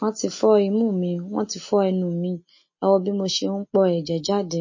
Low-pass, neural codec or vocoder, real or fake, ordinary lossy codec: 7.2 kHz; none; real; MP3, 32 kbps